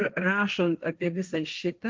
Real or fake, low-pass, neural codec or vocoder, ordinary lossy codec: fake; 7.2 kHz; codec, 16 kHz, 1.1 kbps, Voila-Tokenizer; Opus, 24 kbps